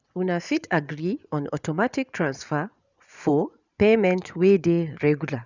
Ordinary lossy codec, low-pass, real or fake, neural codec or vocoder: none; 7.2 kHz; fake; vocoder, 24 kHz, 100 mel bands, Vocos